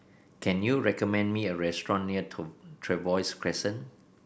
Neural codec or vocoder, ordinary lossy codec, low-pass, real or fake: none; none; none; real